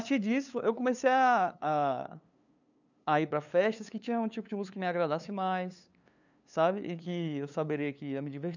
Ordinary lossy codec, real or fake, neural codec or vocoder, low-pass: none; fake; codec, 16 kHz, 2 kbps, FunCodec, trained on LibriTTS, 25 frames a second; 7.2 kHz